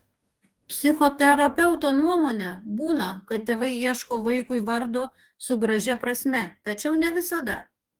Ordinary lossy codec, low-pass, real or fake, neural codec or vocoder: Opus, 32 kbps; 19.8 kHz; fake; codec, 44.1 kHz, 2.6 kbps, DAC